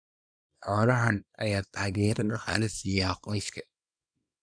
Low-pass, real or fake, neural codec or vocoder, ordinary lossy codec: 9.9 kHz; fake; codec, 24 kHz, 0.9 kbps, WavTokenizer, small release; none